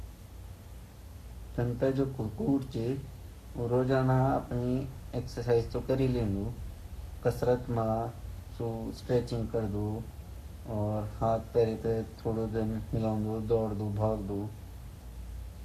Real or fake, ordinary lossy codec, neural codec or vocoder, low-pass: fake; none; codec, 44.1 kHz, 7.8 kbps, Pupu-Codec; 14.4 kHz